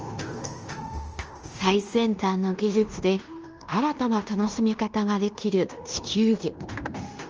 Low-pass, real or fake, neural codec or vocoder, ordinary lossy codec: 7.2 kHz; fake; codec, 16 kHz in and 24 kHz out, 0.9 kbps, LongCat-Audio-Codec, fine tuned four codebook decoder; Opus, 24 kbps